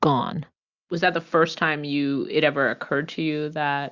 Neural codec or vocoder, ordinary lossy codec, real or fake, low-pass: none; Opus, 64 kbps; real; 7.2 kHz